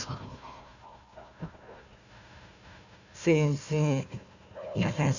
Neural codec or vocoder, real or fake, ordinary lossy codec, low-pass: codec, 16 kHz, 1 kbps, FunCodec, trained on Chinese and English, 50 frames a second; fake; none; 7.2 kHz